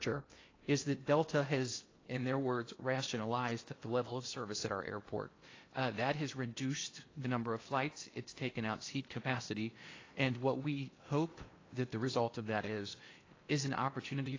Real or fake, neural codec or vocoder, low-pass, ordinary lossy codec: fake; codec, 16 kHz in and 24 kHz out, 0.8 kbps, FocalCodec, streaming, 65536 codes; 7.2 kHz; AAC, 32 kbps